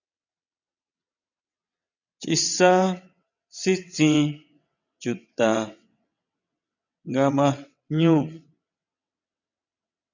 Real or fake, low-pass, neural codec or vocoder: fake; 7.2 kHz; vocoder, 22.05 kHz, 80 mel bands, WaveNeXt